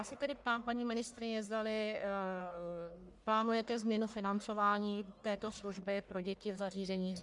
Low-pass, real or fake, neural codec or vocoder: 10.8 kHz; fake; codec, 44.1 kHz, 1.7 kbps, Pupu-Codec